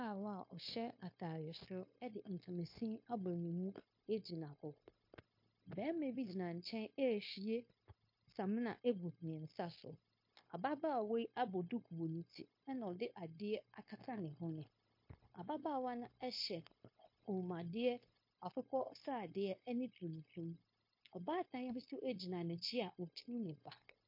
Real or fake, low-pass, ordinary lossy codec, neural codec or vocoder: fake; 5.4 kHz; MP3, 32 kbps; codec, 16 kHz, 0.9 kbps, LongCat-Audio-Codec